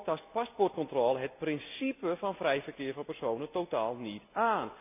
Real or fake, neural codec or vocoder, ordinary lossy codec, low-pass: real; none; none; 3.6 kHz